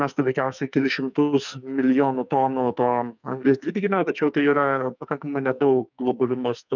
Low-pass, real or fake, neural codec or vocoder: 7.2 kHz; fake; codec, 44.1 kHz, 2.6 kbps, SNAC